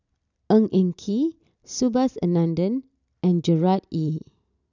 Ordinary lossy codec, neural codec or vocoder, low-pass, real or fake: none; none; 7.2 kHz; real